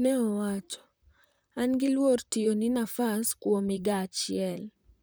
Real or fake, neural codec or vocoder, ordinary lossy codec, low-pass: fake; vocoder, 44.1 kHz, 128 mel bands, Pupu-Vocoder; none; none